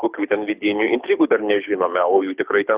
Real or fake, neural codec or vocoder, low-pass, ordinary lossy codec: fake; codec, 24 kHz, 6 kbps, HILCodec; 3.6 kHz; Opus, 64 kbps